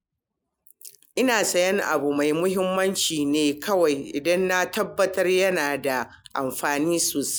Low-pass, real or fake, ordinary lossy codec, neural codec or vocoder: none; real; none; none